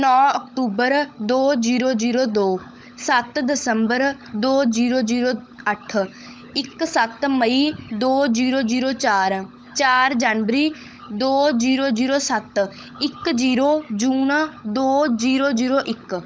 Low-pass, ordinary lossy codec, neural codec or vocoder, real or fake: none; none; codec, 16 kHz, 16 kbps, FunCodec, trained on LibriTTS, 50 frames a second; fake